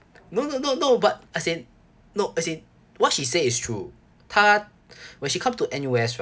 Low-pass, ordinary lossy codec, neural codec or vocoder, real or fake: none; none; none; real